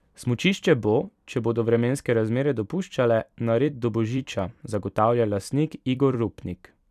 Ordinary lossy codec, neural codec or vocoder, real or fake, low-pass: none; vocoder, 44.1 kHz, 128 mel bands every 256 samples, BigVGAN v2; fake; 14.4 kHz